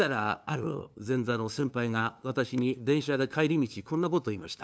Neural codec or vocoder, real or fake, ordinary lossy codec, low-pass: codec, 16 kHz, 2 kbps, FunCodec, trained on LibriTTS, 25 frames a second; fake; none; none